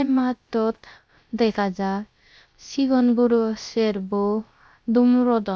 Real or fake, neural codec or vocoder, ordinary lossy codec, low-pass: fake; codec, 16 kHz, 0.3 kbps, FocalCodec; none; none